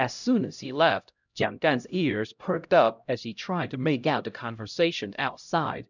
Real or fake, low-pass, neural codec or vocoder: fake; 7.2 kHz; codec, 16 kHz, 0.5 kbps, X-Codec, HuBERT features, trained on LibriSpeech